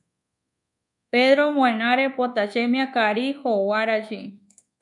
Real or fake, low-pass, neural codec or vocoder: fake; 10.8 kHz; codec, 24 kHz, 1.2 kbps, DualCodec